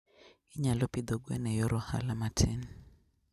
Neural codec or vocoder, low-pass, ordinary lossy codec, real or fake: none; 14.4 kHz; none; real